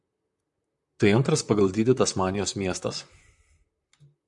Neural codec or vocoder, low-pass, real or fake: vocoder, 44.1 kHz, 128 mel bands, Pupu-Vocoder; 10.8 kHz; fake